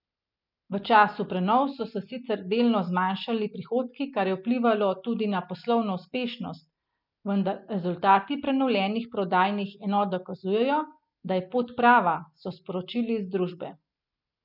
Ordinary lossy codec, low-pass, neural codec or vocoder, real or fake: none; 5.4 kHz; none; real